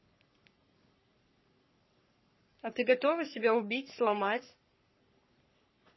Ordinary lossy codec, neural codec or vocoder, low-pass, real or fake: MP3, 24 kbps; codec, 44.1 kHz, 3.4 kbps, Pupu-Codec; 7.2 kHz; fake